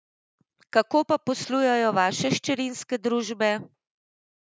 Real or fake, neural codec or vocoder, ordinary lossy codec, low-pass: real; none; none; none